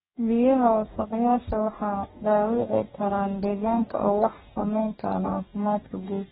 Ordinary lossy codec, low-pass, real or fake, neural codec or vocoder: AAC, 16 kbps; 19.8 kHz; fake; codec, 44.1 kHz, 2.6 kbps, DAC